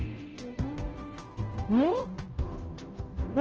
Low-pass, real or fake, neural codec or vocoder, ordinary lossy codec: 7.2 kHz; fake; codec, 16 kHz, 0.5 kbps, FunCodec, trained on Chinese and English, 25 frames a second; Opus, 16 kbps